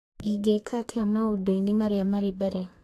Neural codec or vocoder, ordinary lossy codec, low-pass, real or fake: codec, 32 kHz, 1.9 kbps, SNAC; AAC, 64 kbps; 14.4 kHz; fake